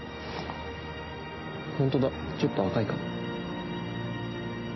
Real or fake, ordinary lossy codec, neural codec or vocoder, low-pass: real; MP3, 24 kbps; none; 7.2 kHz